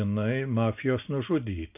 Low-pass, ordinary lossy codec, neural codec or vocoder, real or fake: 3.6 kHz; AAC, 32 kbps; none; real